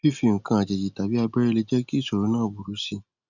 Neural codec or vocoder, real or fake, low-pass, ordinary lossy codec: none; real; 7.2 kHz; none